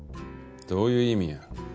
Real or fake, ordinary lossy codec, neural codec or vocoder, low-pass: real; none; none; none